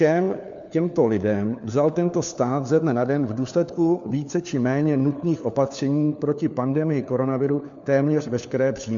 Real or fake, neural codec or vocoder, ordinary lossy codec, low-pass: fake; codec, 16 kHz, 4 kbps, FunCodec, trained on LibriTTS, 50 frames a second; MP3, 64 kbps; 7.2 kHz